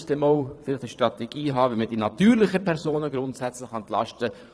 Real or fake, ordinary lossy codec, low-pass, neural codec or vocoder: fake; none; none; vocoder, 22.05 kHz, 80 mel bands, Vocos